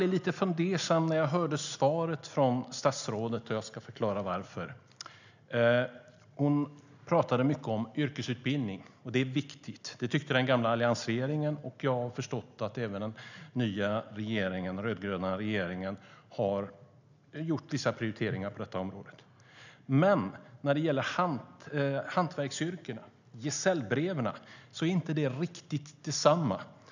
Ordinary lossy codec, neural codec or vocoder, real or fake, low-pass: none; none; real; 7.2 kHz